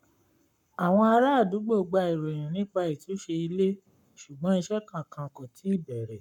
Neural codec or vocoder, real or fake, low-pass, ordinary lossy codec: codec, 44.1 kHz, 7.8 kbps, Pupu-Codec; fake; 19.8 kHz; none